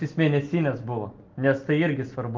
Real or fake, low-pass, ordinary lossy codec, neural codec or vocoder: real; 7.2 kHz; Opus, 32 kbps; none